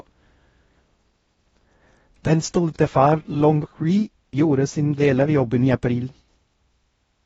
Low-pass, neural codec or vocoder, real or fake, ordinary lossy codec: 10.8 kHz; codec, 16 kHz in and 24 kHz out, 0.6 kbps, FocalCodec, streaming, 4096 codes; fake; AAC, 24 kbps